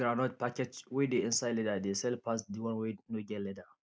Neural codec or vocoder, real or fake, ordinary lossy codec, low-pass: none; real; none; none